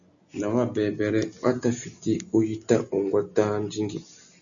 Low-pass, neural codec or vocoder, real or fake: 7.2 kHz; none; real